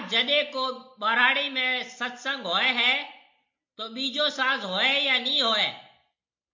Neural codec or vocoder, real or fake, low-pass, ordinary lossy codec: none; real; 7.2 kHz; MP3, 48 kbps